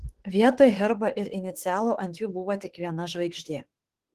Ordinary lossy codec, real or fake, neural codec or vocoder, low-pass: Opus, 16 kbps; fake; autoencoder, 48 kHz, 32 numbers a frame, DAC-VAE, trained on Japanese speech; 14.4 kHz